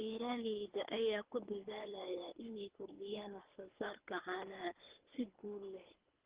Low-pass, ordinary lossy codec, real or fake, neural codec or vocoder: 3.6 kHz; Opus, 16 kbps; fake; codec, 24 kHz, 3 kbps, HILCodec